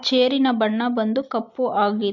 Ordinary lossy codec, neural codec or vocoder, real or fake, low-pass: MP3, 64 kbps; none; real; 7.2 kHz